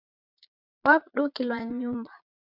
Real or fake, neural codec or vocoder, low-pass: fake; vocoder, 22.05 kHz, 80 mel bands, Vocos; 5.4 kHz